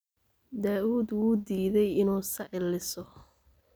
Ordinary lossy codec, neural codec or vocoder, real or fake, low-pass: none; none; real; none